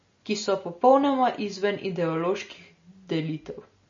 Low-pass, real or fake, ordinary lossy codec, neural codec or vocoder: 7.2 kHz; real; MP3, 32 kbps; none